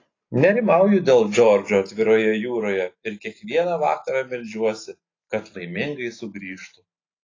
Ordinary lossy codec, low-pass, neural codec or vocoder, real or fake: AAC, 32 kbps; 7.2 kHz; none; real